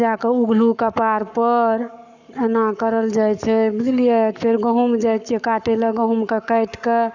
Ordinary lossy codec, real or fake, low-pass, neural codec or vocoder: none; fake; 7.2 kHz; codec, 44.1 kHz, 7.8 kbps, Pupu-Codec